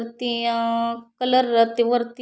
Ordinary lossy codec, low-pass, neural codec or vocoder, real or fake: none; none; none; real